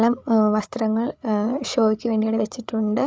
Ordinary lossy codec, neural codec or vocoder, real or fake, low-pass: none; codec, 16 kHz, 8 kbps, FreqCodec, larger model; fake; none